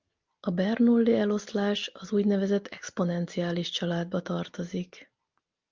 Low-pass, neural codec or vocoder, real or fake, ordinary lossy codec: 7.2 kHz; none; real; Opus, 24 kbps